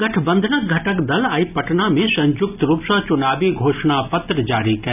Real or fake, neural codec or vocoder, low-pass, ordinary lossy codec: real; none; 3.6 kHz; none